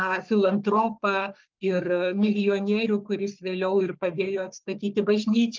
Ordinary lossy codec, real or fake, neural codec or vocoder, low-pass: Opus, 32 kbps; fake; codec, 44.1 kHz, 3.4 kbps, Pupu-Codec; 7.2 kHz